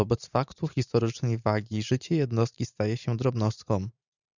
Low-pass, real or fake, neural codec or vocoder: 7.2 kHz; fake; vocoder, 44.1 kHz, 128 mel bands every 512 samples, BigVGAN v2